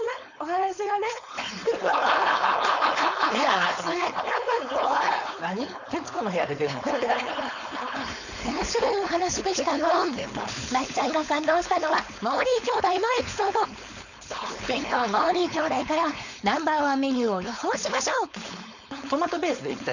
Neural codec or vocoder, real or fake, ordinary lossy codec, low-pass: codec, 16 kHz, 4.8 kbps, FACodec; fake; none; 7.2 kHz